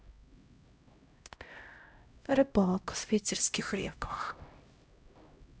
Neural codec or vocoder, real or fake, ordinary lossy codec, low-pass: codec, 16 kHz, 0.5 kbps, X-Codec, HuBERT features, trained on LibriSpeech; fake; none; none